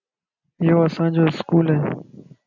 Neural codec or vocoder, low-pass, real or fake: none; 7.2 kHz; real